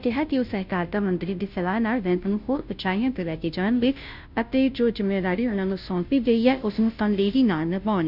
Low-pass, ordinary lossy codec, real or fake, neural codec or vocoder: 5.4 kHz; none; fake; codec, 16 kHz, 0.5 kbps, FunCodec, trained on Chinese and English, 25 frames a second